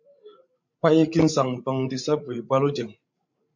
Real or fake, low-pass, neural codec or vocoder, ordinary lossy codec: fake; 7.2 kHz; codec, 16 kHz, 16 kbps, FreqCodec, larger model; MP3, 48 kbps